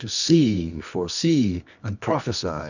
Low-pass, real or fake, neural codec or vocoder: 7.2 kHz; fake; codec, 24 kHz, 0.9 kbps, WavTokenizer, medium music audio release